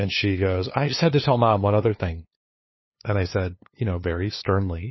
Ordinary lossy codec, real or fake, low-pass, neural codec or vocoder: MP3, 24 kbps; fake; 7.2 kHz; codec, 16 kHz, 2 kbps, FunCodec, trained on LibriTTS, 25 frames a second